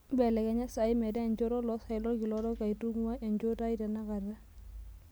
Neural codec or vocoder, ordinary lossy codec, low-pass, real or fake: none; none; none; real